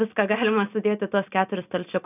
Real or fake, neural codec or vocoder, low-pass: real; none; 3.6 kHz